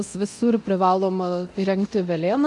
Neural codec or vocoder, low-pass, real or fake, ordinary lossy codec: codec, 24 kHz, 0.9 kbps, DualCodec; 10.8 kHz; fake; MP3, 64 kbps